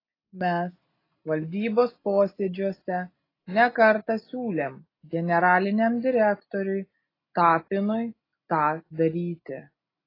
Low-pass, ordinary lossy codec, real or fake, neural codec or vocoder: 5.4 kHz; AAC, 24 kbps; real; none